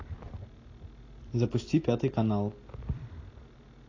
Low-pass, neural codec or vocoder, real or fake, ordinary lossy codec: 7.2 kHz; none; real; AAC, 32 kbps